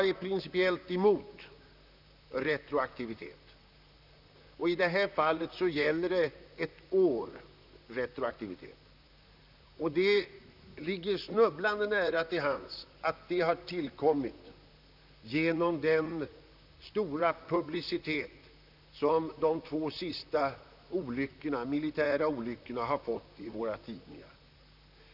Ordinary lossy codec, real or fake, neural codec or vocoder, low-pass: none; fake; vocoder, 44.1 kHz, 128 mel bands, Pupu-Vocoder; 5.4 kHz